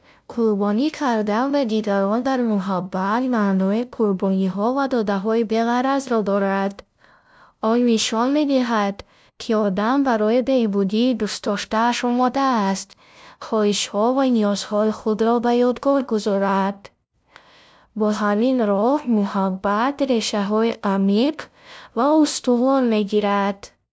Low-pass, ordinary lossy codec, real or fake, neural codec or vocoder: none; none; fake; codec, 16 kHz, 0.5 kbps, FunCodec, trained on LibriTTS, 25 frames a second